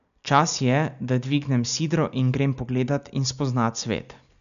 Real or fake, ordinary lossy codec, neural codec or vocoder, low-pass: real; none; none; 7.2 kHz